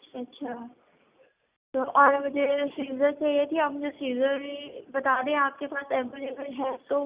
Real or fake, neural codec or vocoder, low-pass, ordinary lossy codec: real; none; 3.6 kHz; Opus, 64 kbps